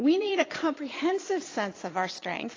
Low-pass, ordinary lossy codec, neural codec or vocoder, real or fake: 7.2 kHz; AAC, 32 kbps; vocoder, 22.05 kHz, 80 mel bands, Vocos; fake